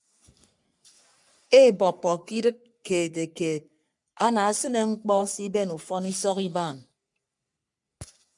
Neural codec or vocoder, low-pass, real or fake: codec, 44.1 kHz, 3.4 kbps, Pupu-Codec; 10.8 kHz; fake